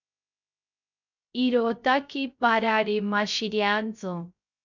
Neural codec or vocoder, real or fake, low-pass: codec, 16 kHz, 0.3 kbps, FocalCodec; fake; 7.2 kHz